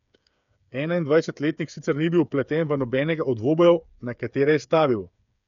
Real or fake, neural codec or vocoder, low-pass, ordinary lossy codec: fake; codec, 16 kHz, 8 kbps, FreqCodec, smaller model; 7.2 kHz; none